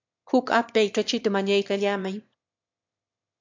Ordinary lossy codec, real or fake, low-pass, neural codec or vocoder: MP3, 64 kbps; fake; 7.2 kHz; autoencoder, 22.05 kHz, a latent of 192 numbers a frame, VITS, trained on one speaker